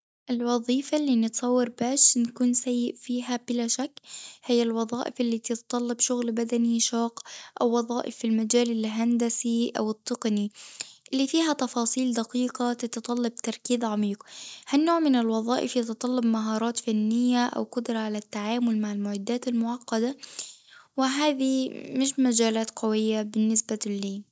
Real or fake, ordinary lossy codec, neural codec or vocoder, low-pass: real; none; none; none